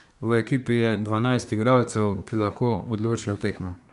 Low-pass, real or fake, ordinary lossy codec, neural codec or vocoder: 10.8 kHz; fake; none; codec, 24 kHz, 1 kbps, SNAC